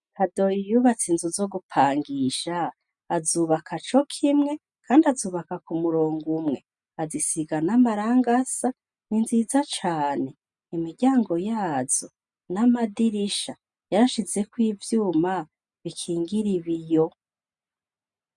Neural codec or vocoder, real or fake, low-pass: none; real; 10.8 kHz